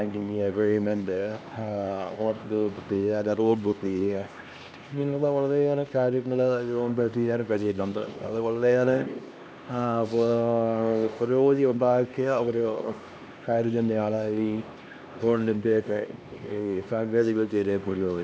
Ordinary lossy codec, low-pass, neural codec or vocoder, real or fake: none; none; codec, 16 kHz, 2 kbps, X-Codec, HuBERT features, trained on LibriSpeech; fake